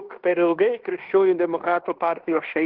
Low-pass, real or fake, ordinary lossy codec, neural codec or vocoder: 5.4 kHz; fake; Opus, 16 kbps; codec, 16 kHz in and 24 kHz out, 0.9 kbps, LongCat-Audio-Codec, fine tuned four codebook decoder